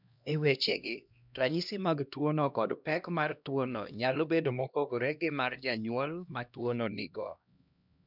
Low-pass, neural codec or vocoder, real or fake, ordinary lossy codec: 5.4 kHz; codec, 16 kHz, 1 kbps, X-Codec, HuBERT features, trained on LibriSpeech; fake; none